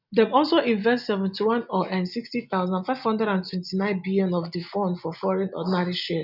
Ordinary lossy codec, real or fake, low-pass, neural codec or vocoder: none; real; 5.4 kHz; none